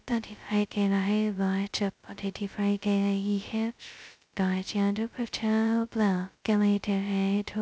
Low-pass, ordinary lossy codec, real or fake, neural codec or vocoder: none; none; fake; codec, 16 kHz, 0.2 kbps, FocalCodec